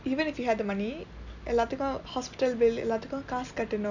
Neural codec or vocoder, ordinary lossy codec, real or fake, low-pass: none; none; real; 7.2 kHz